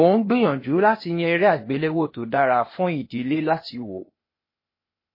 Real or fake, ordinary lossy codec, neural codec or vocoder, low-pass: fake; MP3, 24 kbps; codec, 16 kHz, 0.8 kbps, ZipCodec; 5.4 kHz